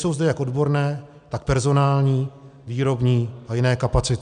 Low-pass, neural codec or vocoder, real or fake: 9.9 kHz; none; real